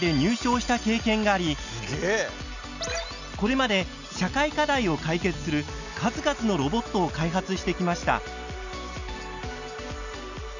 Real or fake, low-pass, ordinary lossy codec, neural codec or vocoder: real; 7.2 kHz; none; none